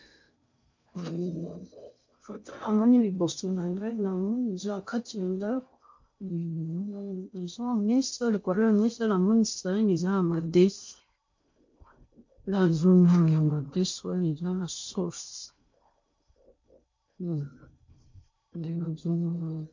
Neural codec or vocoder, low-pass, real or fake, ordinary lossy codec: codec, 16 kHz in and 24 kHz out, 0.6 kbps, FocalCodec, streaming, 4096 codes; 7.2 kHz; fake; MP3, 48 kbps